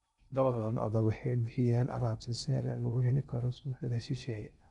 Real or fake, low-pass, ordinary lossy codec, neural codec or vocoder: fake; 10.8 kHz; none; codec, 16 kHz in and 24 kHz out, 0.6 kbps, FocalCodec, streaming, 2048 codes